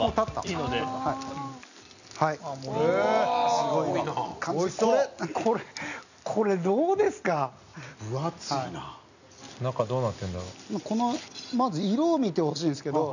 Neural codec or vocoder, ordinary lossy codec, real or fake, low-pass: none; none; real; 7.2 kHz